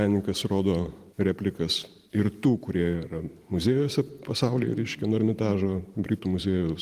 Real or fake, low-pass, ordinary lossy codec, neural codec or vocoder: fake; 14.4 kHz; Opus, 24 kbps; vocoder, 44.1 kHz, 128 mel bands every 512 samples, BigVGAN v2